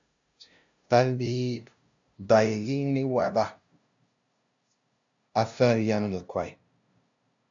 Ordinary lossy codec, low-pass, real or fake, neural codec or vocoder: AAC, 64 kbps; 7.2 kHz; fake; codec, 16 kHz, 0.5 kbps, FunCodec, trained on LibriTTS, 25 frames a second